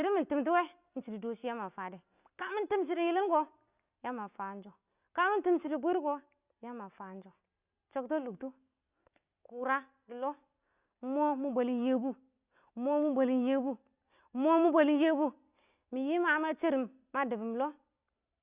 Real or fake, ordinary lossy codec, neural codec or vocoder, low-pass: real; Opus, 64 kbps; none; 3.6 kHz